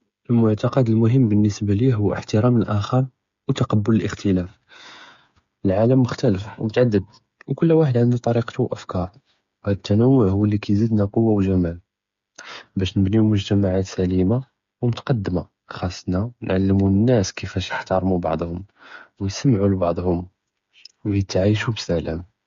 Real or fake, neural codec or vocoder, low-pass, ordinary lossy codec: fake; codec, 16 kHz, 8 kbps, FreqCodec, smaller model; 7.2 kHz; MP3, 48 kbps